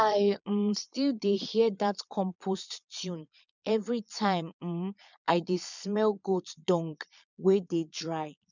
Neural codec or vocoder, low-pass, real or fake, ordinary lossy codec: vocoder, 22.05 kHz, 80 mel bands, Vocos; 7.2 kHz; fake; none